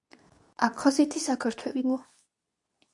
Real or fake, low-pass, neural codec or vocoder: fake; 10.8 kHz; codec, 24 kHz, 0.9 kbps, WavTokenizer, medium speech release version 2